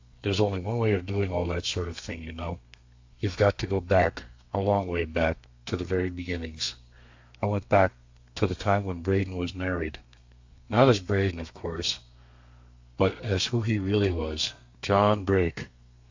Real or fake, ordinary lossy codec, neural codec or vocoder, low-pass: fake; AAC, 48 kbps; codec, 32 kHz, 1.9 kbps, SNAC; 7.2 kHz